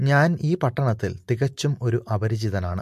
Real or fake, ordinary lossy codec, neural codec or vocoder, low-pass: real; MP3, 64 kbps; none; 14.4 kHz